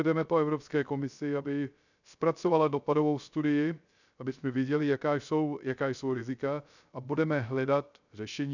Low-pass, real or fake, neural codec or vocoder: 7.2 kHz; fake; codec, 16 kHz, 0.3 kbps, FocalCodec